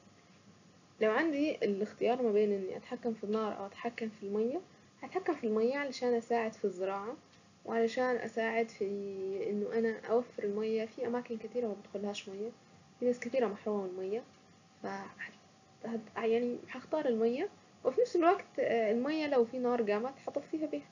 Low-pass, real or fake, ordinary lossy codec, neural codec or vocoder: 7.2 kHz; real; none; none